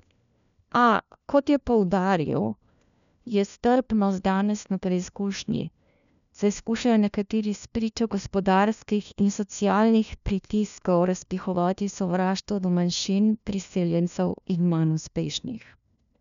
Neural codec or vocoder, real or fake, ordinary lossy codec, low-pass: codec, 16 kHz, 1 kbps, FunCodec, trained on LibriTTS, 50 frames a second; fake; none; 7.2 kHz